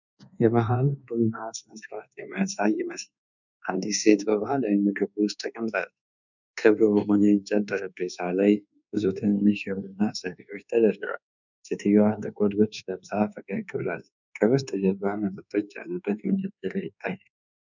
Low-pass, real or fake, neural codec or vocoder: 7.2 kHz; fake; codec, 24 kHz, 1.2 kbps, DualCodec